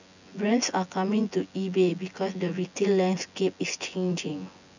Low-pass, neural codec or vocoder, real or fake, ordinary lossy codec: 7.2 kHz; vocoder, 24 kHz, 100 mel bands, Vocos; fake; none